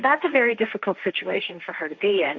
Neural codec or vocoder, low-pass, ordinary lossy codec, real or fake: codec, 16 kHz, 1.1 kbps, Voila-Tokenizer; 7.2 kHz; Opus, 64 kbps; fake